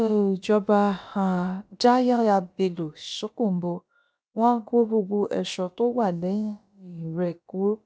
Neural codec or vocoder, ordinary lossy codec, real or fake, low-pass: codec, 16 kHz, about 1 kbps, DyCAST, with the encoder's durations; none; fake; none